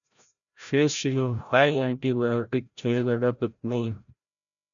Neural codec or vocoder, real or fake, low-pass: codec, 16 kHz, 0.5 kbps, FreqCodec, larger model; fake; 7.2 kHz